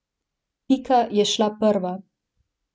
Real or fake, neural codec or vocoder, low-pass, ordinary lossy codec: real; none; none; none